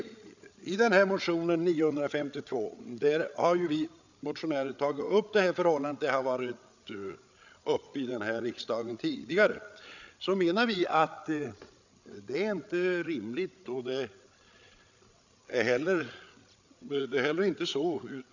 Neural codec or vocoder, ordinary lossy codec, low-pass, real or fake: codec, 16 kHz, 8 kbps, FreqCodec, larger model; none; 7.2 kHz; fake